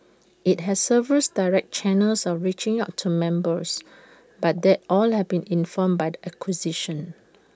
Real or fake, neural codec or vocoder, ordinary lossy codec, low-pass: real; none; none; none